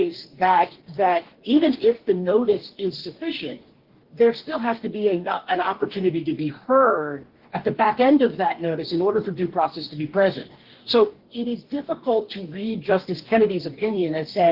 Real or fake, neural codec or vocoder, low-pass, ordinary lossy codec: fake; codec, 44.1 kHz, 2.6 kbps, DAC; 5.4 kHz; Opus, 16 kbps